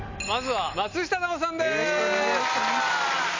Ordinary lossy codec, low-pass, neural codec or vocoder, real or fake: MP3, 64 kbps; 7.2 kHz; none; real